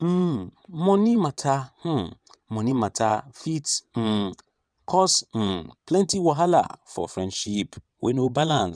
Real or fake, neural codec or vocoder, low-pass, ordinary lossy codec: fake; vocoder, 22.05 kHz, 80 mel bands, WaveNeXt; 9.9 kHz; none